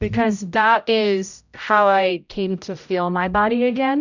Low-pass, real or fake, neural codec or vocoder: 7.2 kHz; fake; codec, 16 kHz, 0.5 kbps, X-Codec, HuBERT features, trained on general audio